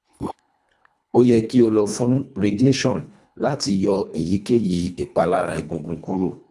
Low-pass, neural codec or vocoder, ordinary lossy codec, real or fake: none; codec, 24 kHz, 1.5 kbps, HILCodec; none; fake